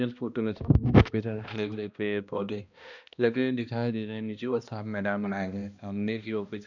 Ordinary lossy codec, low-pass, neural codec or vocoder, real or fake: none; 7.2 kHz; codec, 16 kHz, 1 kbps, X-Codec, HuBERT features, trained on balanced general audio; fake